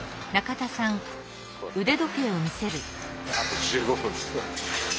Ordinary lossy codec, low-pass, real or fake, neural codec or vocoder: none; none; real; none